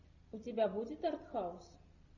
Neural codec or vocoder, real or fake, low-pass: none; real; 7.2 kHz